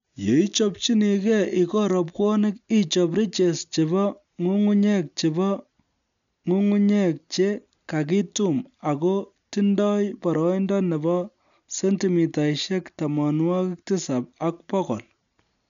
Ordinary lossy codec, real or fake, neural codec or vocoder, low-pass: none; real; none; 7.2 kHz